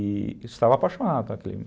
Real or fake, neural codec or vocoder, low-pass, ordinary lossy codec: real; none; none; none